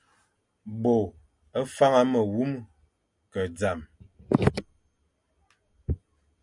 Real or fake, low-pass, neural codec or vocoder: real; 10.8 kHz; none